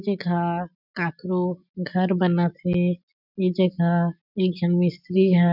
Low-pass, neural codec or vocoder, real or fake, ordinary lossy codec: 5.4 kHz; none; real; none